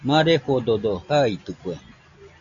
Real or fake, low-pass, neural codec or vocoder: real; 7.2 kHz; none